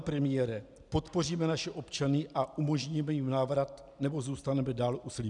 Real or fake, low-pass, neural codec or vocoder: real; 10.8 kHz; none